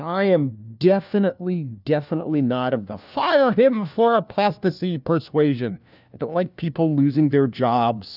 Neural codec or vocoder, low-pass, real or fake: codec, 16 kHz, 1 kbps, FunCodec, trained on LibriTTS, 50 frames a second; 5.4 kHz; fake